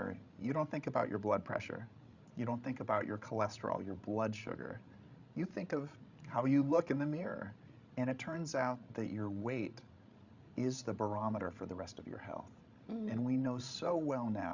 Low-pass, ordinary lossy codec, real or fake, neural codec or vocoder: 7.2 kHz; Opus, 64 kbps; fake; codec, 16 kHz, 16 kbps, FreqCodec, larger model